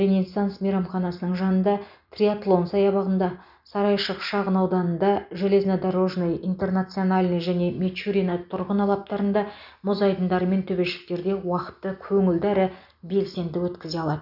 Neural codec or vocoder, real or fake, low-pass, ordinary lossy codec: none; real; 5.4 kHz; none